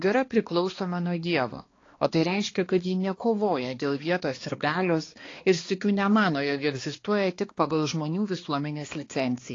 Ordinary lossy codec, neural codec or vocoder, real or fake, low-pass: AAC, 32 kbps; codec, 16 kHz, 2 kbps, X-Codec, HuBERT features, trained on balanced general audio; fake; 7.2 kHz